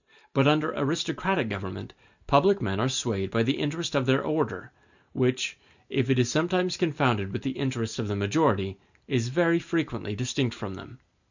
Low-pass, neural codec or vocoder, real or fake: 7.2 kHz; none; real